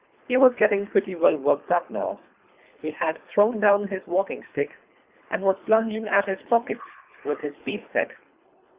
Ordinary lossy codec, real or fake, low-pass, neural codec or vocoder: Opus, 64 kbps; fake; 3.6 kHz; codec, 24 kHz, 3 kbps, HILCodec